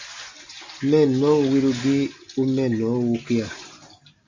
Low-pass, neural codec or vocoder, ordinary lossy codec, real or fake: 7.2 kHz; none; MP3, 64 kbps; real